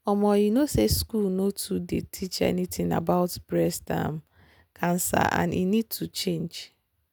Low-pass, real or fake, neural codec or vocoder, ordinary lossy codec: none; real; none; none